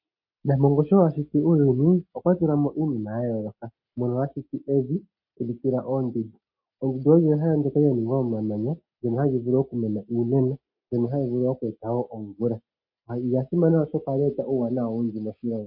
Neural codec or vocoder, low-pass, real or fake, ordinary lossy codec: none; 5.4 kHz; real; MP3, 32 kbps